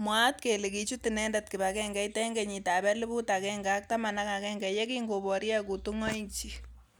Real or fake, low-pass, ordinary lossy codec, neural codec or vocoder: real; none; none; none